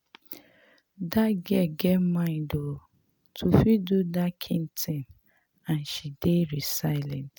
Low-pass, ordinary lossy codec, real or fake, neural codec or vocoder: none; none; real; none